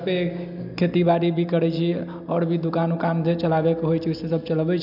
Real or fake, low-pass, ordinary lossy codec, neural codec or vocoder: real; 5.4 kHz; none; none